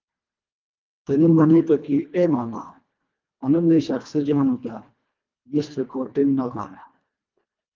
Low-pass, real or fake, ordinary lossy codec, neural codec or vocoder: 7.2 kHz; fake; Opus, 24 kbps; codec, 24 kHz, 1.5 kbps, HILCodec